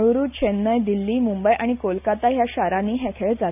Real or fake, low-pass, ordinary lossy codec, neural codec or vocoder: real; 3.6 kHz; none; none